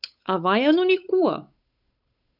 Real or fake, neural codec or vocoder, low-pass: fake; codec, 16 kHz, 8 kbps, FunCodec, trained on Chinese and English, 25 frames a second; 5.4 kHz